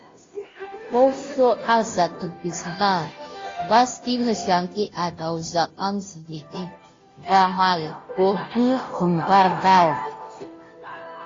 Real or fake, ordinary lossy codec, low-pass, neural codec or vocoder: fake; AAC, 32 kbps; 7.2 kHz; codec, 16 kHz, 0.5 kbps, FunCodec, trained on Chinese and English, 25 frames a second